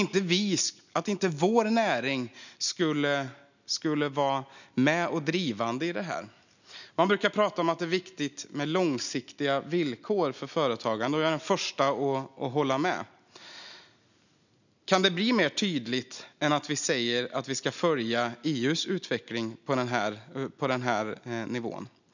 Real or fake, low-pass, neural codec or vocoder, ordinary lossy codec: real; 7.2 kHz; none; none